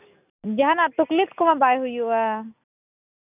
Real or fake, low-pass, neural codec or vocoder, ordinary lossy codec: real; 3.6 kHz; none; none